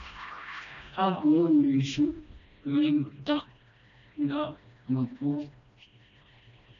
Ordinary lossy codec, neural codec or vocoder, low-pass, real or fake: MP3, 96 kbps; codec, 16 kHz, 1 kbps, FreqCodec, smaller model; 7.2 kHz; fake